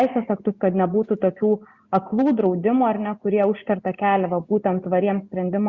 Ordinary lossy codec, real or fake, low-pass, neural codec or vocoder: Opus, 64 kbps; real; 7.2 kHz; none